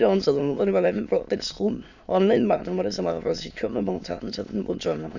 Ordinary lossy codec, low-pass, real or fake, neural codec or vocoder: none; 7.2 kHz; fake; autoencoder, 22.05 kHz, a latent of 192 numbers a frame, VITS, trained on many speakers